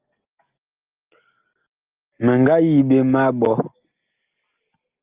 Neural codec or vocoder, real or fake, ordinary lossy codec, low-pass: none; real; Opus, 24 kbps; 3.6 kHz